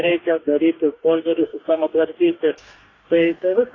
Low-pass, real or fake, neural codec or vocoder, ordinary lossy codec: 7.2 kHz; fake; codec, 44.1 kHz, 2.6 kbps, DAC; AAC, 32 kbps